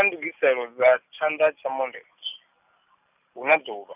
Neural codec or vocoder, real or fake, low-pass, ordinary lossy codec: none; real; 3.6 kHz; none